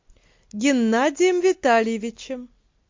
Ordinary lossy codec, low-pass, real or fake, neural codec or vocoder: MP3, 48 kbps; 7.2 kHz; real; none